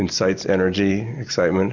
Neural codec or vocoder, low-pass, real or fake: none; 7.2 kHz; real